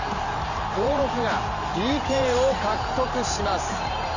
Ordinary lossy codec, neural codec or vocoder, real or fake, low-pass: none; vocoder, 44.1 kHz, 128 mel bands every 512 samples, BigVGAN v2; fake; 7.2 kHz